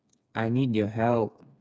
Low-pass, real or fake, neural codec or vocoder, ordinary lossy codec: none; fake; codec, 16 kHz, 4 kbps, FreqCodec, smaller model; none